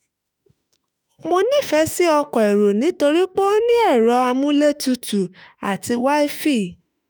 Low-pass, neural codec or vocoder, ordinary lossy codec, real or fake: none; autoencoder, 48 kHz, 32 numbers a frame, DAC-VAE, trained on Japanese speech; none; fake